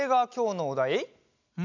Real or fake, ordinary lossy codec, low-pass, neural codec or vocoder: real; none; 7.2 kHz; none